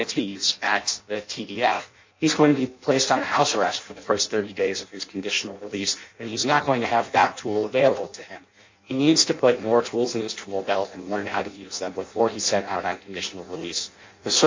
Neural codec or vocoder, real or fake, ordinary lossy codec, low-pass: codec, 16 kHz in and 24 kHz out, 0.6 kbps, FireRedTTS-2 codec; fake; MP3, 64 kbps; 7.2 kHz